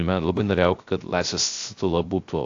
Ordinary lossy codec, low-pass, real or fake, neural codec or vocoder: AAC, 48 kbps; 7.2 kHz; fake; codec, 16 kHz, 0.3 kbps, FocalCodec